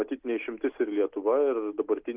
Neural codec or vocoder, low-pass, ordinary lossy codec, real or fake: none; 3.6 kHz; Opus, 32 kbps; real